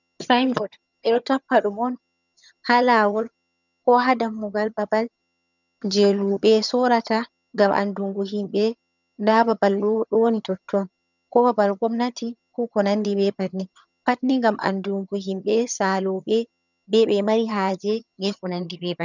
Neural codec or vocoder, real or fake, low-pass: vocoder, 22.05 kHz, 80 mel bands, HiFi-GAN; fake; 7.2 kHz